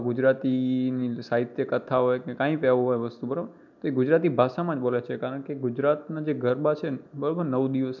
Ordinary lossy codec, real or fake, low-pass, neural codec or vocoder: none; real; 7.2 kHz; none